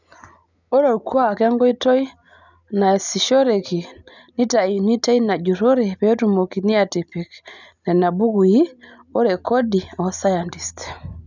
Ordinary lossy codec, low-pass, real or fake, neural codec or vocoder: none; 7.2 kHz; real; none